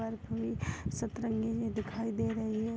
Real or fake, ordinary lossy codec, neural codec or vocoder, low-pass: real; none; none; none